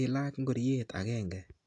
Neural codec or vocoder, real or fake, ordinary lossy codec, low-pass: none; real; AAC, 48 kbps; 10.8 kHz